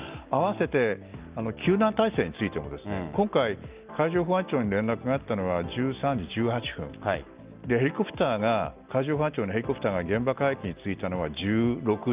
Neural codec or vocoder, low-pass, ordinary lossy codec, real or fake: none; 3.6 kHz; Opus, 24 kbps; real